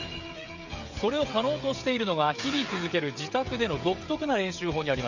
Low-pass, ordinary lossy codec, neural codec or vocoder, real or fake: 7.2 kHz; none; codec, 16 kHz, 16 kbps, FreqCodec, smaller model; fake